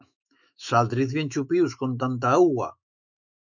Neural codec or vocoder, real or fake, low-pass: autoencoder, 48 kHz, 128 numbers a frame, DAC-VAE, trained on Japanese speech; fake; 7.2 kHz